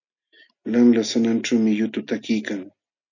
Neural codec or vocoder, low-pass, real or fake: none; 7.2 kHz; real